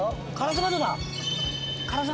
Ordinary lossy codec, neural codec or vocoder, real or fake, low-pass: none; none; real; none